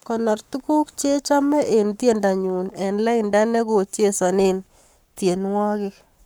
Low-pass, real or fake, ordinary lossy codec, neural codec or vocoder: none; fake; none; codec, 44.1 kHz, 7.8 kbps, Pupu-Codec